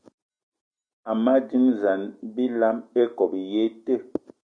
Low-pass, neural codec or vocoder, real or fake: 9.9 kHz; none; real